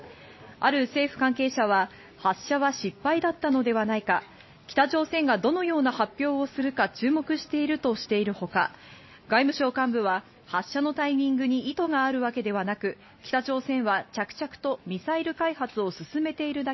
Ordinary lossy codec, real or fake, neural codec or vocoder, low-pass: MP3, 24 kbps; real; none; 7.2 kHz